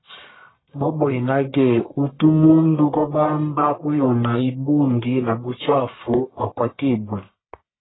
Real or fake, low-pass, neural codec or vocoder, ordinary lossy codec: fake; 7.2 kHz; codec, 44.1 kHz, 1.7 kbps, Pupu-Codec; AAC, 16 kbps